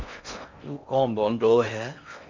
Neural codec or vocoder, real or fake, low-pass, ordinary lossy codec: codec, 16 kHz in and 24 kHz out, 0.6 kbps, FocalCodec, streaming, 4096 codes; fake; 7.2 kHz; MP3, 64 kbps